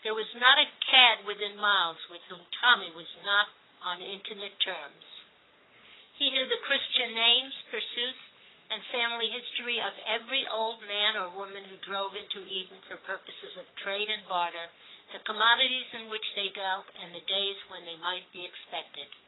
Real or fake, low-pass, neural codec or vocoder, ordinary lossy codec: fake; 7.2 kHz; codec, 44.1 kHz, 3.4 kbps, Pupu-Codec; AAC, 16 kbps